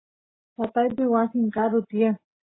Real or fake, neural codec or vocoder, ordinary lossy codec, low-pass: real; none; AAC, 16 kbps; 7.2 kHz